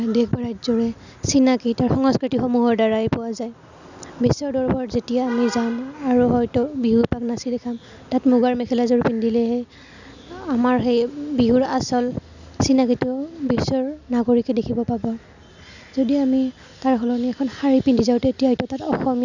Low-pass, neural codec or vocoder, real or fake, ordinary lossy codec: 7.2 kHz; none; real; none